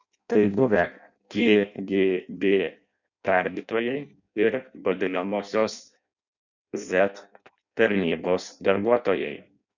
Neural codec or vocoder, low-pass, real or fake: codec, 16 kHz in and 24 kHz out, 0.6 kbps, FireRedTTS-2 codec; 7.2 kHz; fake